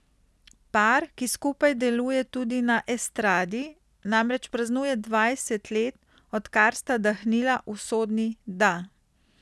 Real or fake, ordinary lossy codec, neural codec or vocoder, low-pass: real; none; none; none